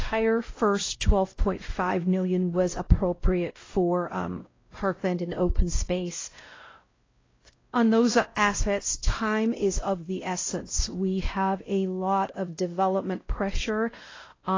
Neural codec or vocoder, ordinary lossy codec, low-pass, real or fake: codec, 16 kHz, 0.5 kbps, X-Codec, WavLM features, trained on Multilingual LibriSpeech; AAC, 32 kbps; 7.2 kHz; fake